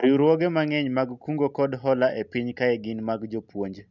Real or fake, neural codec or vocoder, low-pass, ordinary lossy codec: real; none; 7.2 kHz; none